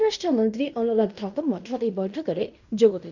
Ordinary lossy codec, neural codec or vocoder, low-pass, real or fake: none; codec, 16 kHz in and 24 kHz out, 0.9 kbps, LongCat-Audio-Codec, fine tuned four codebook decoder; 7.2 kHz; fake